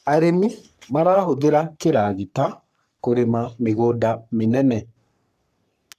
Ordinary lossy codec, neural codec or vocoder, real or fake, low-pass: none; codec, 44.1 kHz, 3.4 kbps, Pupu-Codec; fake; 14.4 kHz